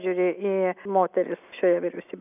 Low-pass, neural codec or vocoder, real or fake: 3.6 kHz; none; real